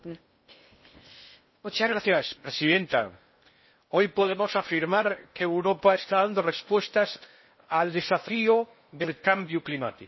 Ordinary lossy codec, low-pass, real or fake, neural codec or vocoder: MP3, 24 kbps; 7.2 kHz; fake; codec, 16 kHz in and 24 kHz out, 0.6 kbps, FocalCodec, streaming, 4096 codes